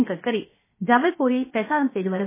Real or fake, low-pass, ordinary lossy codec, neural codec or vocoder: fake; 3.6 kHz; MP3, 16 kbps; codec, 16 kHz, 0.7 kbps, FocalCodec